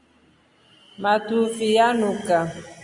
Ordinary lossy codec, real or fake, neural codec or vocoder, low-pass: Opus, 64 kbps; real; none; 10.8 kHz